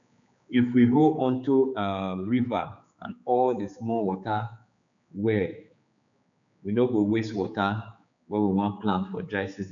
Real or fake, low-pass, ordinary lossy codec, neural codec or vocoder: fake; 7.2 kHz; none; codec, 16 kHz, 4 kbps, X-Codec, HuBERT features, trained on general audio